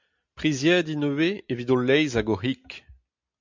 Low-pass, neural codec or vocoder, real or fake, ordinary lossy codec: 7.2 kHz; none; real; MP3, 64 kbps